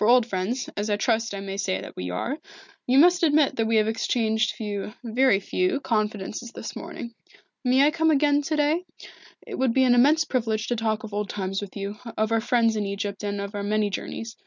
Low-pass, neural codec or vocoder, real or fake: 7.2 kHz; none; real